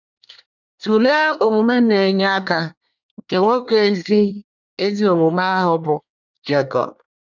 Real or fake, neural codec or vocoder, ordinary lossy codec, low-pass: fake; codec, 24 kHz, 1 kbps, SNAC; none; 7.2 kHz